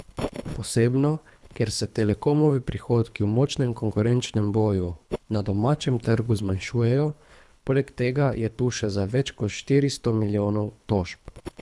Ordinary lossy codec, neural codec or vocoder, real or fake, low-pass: none; codec, 24 kHz, 3 kbps, HILCodec; fake; none